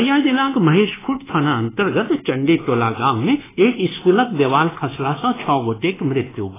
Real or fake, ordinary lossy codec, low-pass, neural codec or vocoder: fake; AAC, 16 kbps; 3.6 kHz; codec, 24 kHz, 1.2 kbps, DualCodec